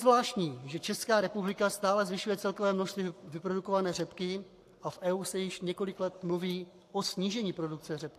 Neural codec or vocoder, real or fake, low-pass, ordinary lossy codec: codec, 44.1 kHz, 7.8 kbps, Pupu-Codec; fake; 14.4 kHz; AAC, 64 kbps